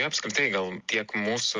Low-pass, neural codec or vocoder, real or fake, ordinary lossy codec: 7.2 kHz; none; real; Opus, 32 kbps